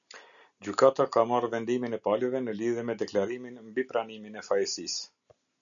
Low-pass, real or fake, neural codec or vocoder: 7.2 kHz; real; none